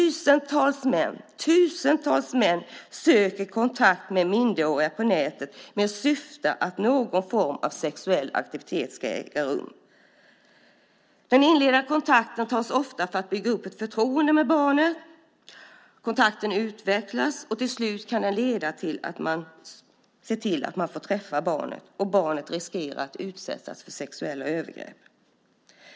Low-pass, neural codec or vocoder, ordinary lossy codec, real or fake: none; none; none; real